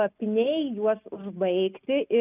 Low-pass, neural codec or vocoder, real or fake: 3.6 kHz; none; real